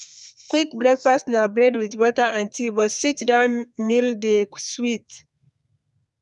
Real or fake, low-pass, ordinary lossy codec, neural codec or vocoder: fake; 10.8 kHz; none; codec, 32 kHz, 1.9 kbps, SNAC